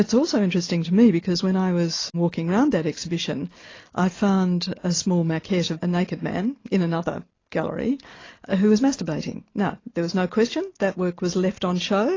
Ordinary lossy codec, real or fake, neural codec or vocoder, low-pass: AAC, 32 kbps; real; none; 7.2 kHz